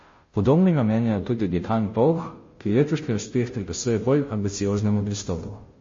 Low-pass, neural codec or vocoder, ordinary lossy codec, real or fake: 7.2 kHz; codec, 16 kHz, 0.5 kbps, FunCodec, trained on Chinese and English, 25 frames a second; MP3, 32 kbps; fake